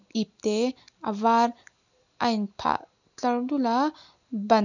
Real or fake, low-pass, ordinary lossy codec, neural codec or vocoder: real; 7.2 kHz; none; none